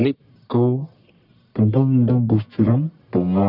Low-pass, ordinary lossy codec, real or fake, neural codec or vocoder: 5.4 kHz; none; fake; codec, 44.1 kHz, 1.7 kbps, Pupu-Codec